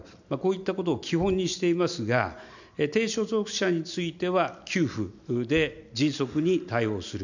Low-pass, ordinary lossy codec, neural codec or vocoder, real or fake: 7.2 kHz; none; none; real